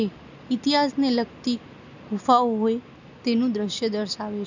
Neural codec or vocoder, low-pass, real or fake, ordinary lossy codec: none; 7.2 kHz; real; none